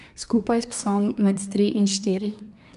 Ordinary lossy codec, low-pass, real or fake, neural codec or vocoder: none; 10.8 kHz; fake; codec, 24 kHz, 1 kbps, SNAC